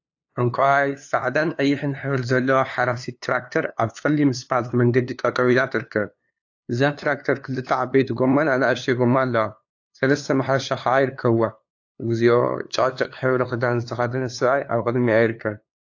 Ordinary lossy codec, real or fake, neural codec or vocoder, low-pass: AAC, 48 kbps; fake; codec, 16 kHz, 2 kbps, FunCodec, trained on LibriTTS, 25 frames a second; 7.2 kHz